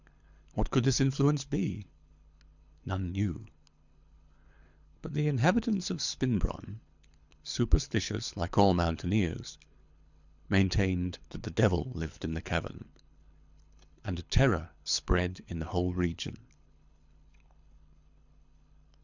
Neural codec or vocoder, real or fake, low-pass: codec, 24 kHz, 6 kbps, HILCodec; fake; 7.2 kHz